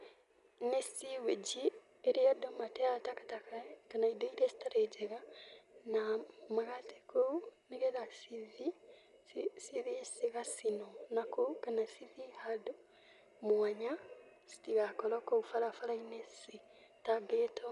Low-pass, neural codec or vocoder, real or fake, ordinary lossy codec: 10.8 kHz; none; real; none